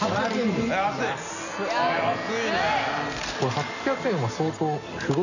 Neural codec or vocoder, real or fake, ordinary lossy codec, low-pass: none; real; none; 7.2 kHz